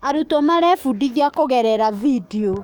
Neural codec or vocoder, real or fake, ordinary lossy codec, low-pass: codec, 44.1 kHz, 7.8 kbps, DAC; fake; none; 19.8 kHz